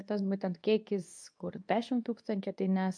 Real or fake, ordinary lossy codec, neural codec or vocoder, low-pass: fake; MP3, 64 kbps; codec, 24 kHz, 0.9 kbps, WavTokenizer, medium speech release version 2; 9.9 kHz